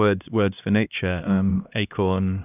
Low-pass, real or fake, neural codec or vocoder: 3.6 kHz; fake; codec, 16 kHz, 1 kbps, X-Codec, HuBERT features, trained on LibriSpeech